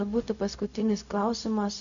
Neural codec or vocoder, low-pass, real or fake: codec, 16 kHz, 0.4 kbps, LongCat-Audio-Codec; 7.2 kHz; fake